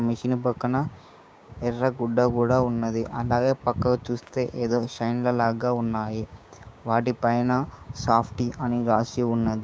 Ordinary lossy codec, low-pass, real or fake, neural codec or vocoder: none; none; fake; codec, 16 kHz, 6 kbps, DAC